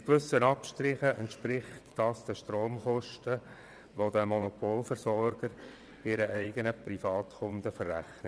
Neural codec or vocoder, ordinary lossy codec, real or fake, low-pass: vocoder, 22.05 kHz, 80 mel bands, WaveNeXt; none; fake; none